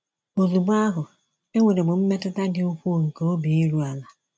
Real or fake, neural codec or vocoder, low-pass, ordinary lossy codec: real; none; none; none